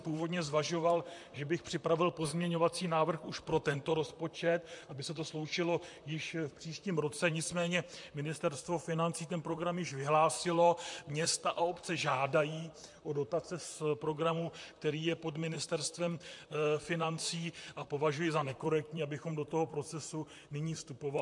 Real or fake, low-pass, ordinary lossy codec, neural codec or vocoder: fake; 10.8 kHz; MP3, 64 kbps; vocoder, 44.1 kHz, 128 mel bands, Pupu-Vocoder